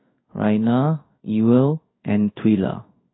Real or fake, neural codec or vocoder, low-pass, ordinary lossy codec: fake; codec, 16 kHz in and 24 kHz out, 1 kbps, XY-Tokenizer; 7.2 kHz; AAC, 16 kbps